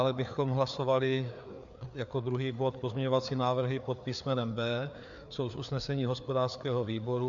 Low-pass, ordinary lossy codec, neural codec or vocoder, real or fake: 7.2 kHz; AAC, 64 kbps; codec, 16 kHz, 4 kbps, FreqCodec, larger model; fake